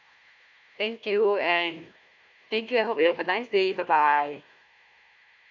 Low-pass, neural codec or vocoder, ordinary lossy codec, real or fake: 7.2 kHz; codec, 16 kHz, 1 kbps, FunCodec, trained on Chinese and English, 50 frames a second; none; fake